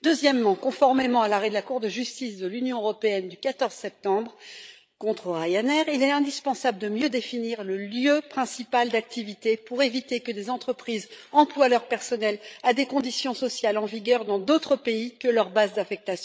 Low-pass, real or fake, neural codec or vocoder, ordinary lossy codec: none; fake; codec, 16 kHz, 8 kbps, FreqCodec, larger model; none